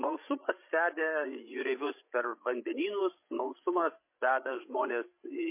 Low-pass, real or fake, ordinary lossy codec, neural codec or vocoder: 3.6 kHz; fake; MP3, 24 kbps; codec, 16 kHz, 16 kbps, FreqCodec, larger model